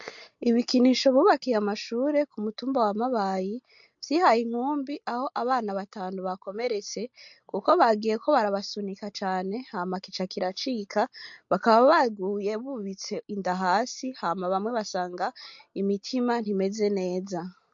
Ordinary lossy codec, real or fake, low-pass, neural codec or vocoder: MP3, 48 kbps; real; 7.2 kHz; none